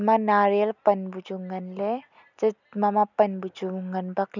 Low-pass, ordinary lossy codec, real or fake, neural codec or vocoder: 7.2 kHz; none; real; none